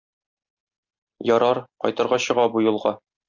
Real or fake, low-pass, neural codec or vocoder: real; 7.2 kHz; none